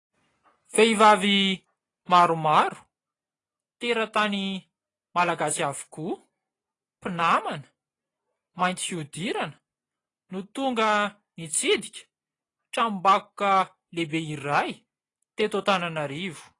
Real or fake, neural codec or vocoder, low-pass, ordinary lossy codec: real; none; 10.8 kHz; AAC, 32 kbps